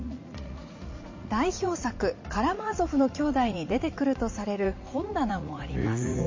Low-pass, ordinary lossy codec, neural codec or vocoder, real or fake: 7.2 kHz; MP3, 32 kbps; vocoder, 44.1 kHz, 80 mel bands, Vocos; fake